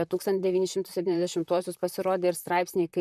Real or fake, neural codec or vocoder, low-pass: fake; vocoder, 44.1 kHz, 128 mel bands, Pupu-Vocoder; 14.4 kHz